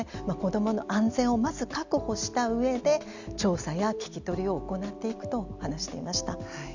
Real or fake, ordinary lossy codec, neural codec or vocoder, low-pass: real; none; none; 7.2 kHz